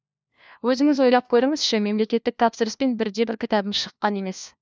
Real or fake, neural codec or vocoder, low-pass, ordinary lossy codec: fake; codec, 16 kHz, 1 kbps, FunCodec, trained on LibriTTS, 50 frames a second; none; none